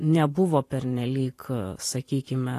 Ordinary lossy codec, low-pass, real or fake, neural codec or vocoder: AAC, 48 kbps; 14.4 kHz; fake; vocoder, 44.1 kHz, 128 mel bands every 512 samples, BigVGAN v2